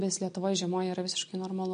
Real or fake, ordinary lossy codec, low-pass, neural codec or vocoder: real; MP3, 48 kbps; 9.9 kHz; none